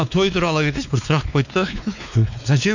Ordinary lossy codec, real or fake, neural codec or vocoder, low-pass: none; fake; codec, 16 kHz, 2 kbps, X-Codec, WavLM features, trained on Multilingual LibriSpeech; 7.2 kHz